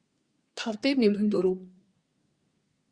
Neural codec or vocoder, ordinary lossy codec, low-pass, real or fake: codec, 24 kHz, 1 kbps, SNAC; Opus, 64 kbps; 9.9 kHz; fake